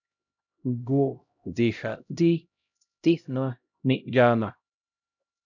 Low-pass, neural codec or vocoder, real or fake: 7.2 kHz; codec, 16 kHz, 0.5 kbps, X-Codec, HuBERT features, trained on LibriSpeech; fake